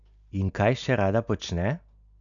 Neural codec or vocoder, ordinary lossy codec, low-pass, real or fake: none; none; 7.2 kHz; real